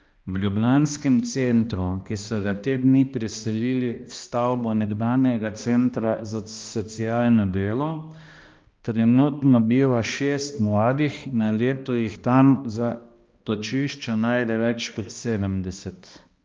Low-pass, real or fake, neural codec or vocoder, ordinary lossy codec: 7.2 kHz; fake; codec, 16 kHz, 1 kbps, X-Codec, HuBERT features, trained on balanced general audio; Opus, 24 kbps